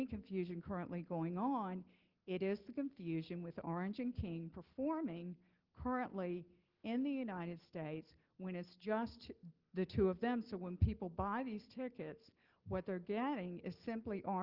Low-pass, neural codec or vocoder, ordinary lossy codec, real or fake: 5.4 kHz; none; Opus, 16 kbps; real